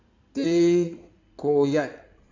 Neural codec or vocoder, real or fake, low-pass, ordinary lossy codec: codec, 16 kHz in and 24 kHz out, 2.2 kbps, FireRedTTS-2 codec; fake; 7.2 kHz; MP3, 64 kbps